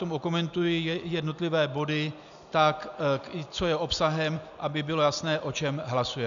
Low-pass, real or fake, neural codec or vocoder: 7.2 kHz; real; none